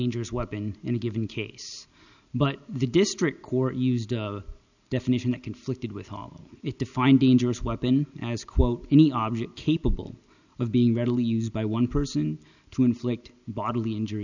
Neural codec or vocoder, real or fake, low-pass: none; real; 7.2 kHz